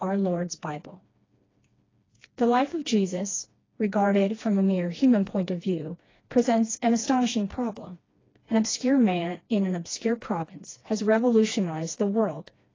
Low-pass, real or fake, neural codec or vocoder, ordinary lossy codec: 7.2 kHz; fake; codec, 16 kHz, 2 kbps, FreqCodec, smaller model; AAC, 32 kbps